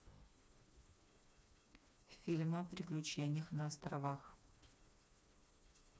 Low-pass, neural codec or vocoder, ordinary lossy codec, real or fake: none; codec, 16 kHz, 2 kbps, FreqCodec, smaller model; none; fake